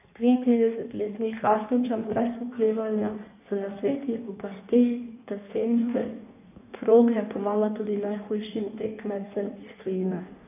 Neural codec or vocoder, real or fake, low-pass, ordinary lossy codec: codec, 16 kHz in and 24 kHz out, 1.1 kbps, FireRedTTS-2 codec; fake; 3.6 kHz; none